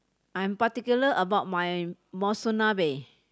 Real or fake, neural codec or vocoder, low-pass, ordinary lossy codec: real; none; none; none